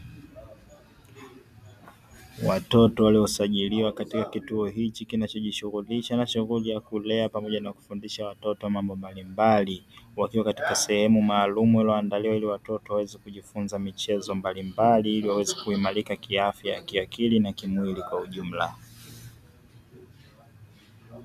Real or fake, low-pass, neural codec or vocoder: real; 14.4 kHz; none